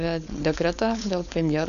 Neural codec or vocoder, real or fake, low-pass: codec, 16 kHz, 4.8 kbps, FACodec; fake; 7.2 kHz